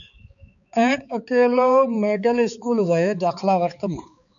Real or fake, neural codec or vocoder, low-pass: fake; codec, 16 kHz, 4 kbps, X-Codec, HuBERT features, trained on balanced general audio; 7.2 kHz